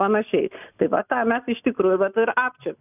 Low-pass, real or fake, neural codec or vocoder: 3.6 kHz; real; none